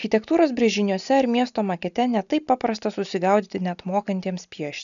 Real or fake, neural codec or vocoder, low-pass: real; none; 7.2 kHz